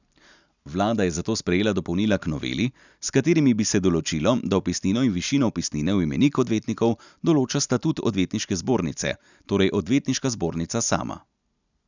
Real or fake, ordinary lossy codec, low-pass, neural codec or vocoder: real; none; 7.2 kHz; none